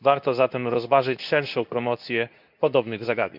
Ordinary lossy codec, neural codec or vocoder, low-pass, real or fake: none; codec, 24 kHz, 0.9 kbps, WavTokenizer, medium speech release version 2; 5.4 kHz; fake